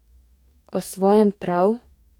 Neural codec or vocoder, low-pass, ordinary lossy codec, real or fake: codec, 44.1 kHz, 2.6 kbps, DAC; 19.8 kHz; none; fake